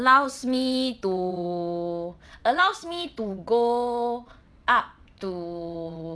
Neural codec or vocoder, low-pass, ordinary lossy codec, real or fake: vocoder, 22.05 kHz, 80 mel bands, WaveNeXt; none; none; fake